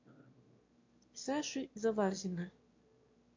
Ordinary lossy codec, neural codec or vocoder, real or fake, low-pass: MP3, 48 kbps; autoencoder, 22.05 kHz, a latent of 192 numbers a frame, VITS, trained on one speaker; fake; 7.2 kHz